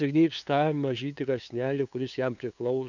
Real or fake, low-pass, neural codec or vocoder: fake; 7.2 kHz; codec, 16 kHz, 2 kbps, FunCodec, trained on LibriTTS, 25 frames a second